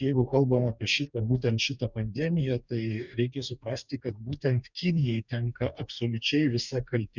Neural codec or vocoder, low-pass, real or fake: codec, 44.1 kHz, 2.6 kbps, DAC; 7.2 kHz; fake